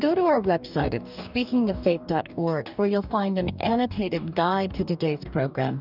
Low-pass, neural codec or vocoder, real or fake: 5.4 kHz; codec, 44.1 kHz, 2.6 kbps, DAC; fake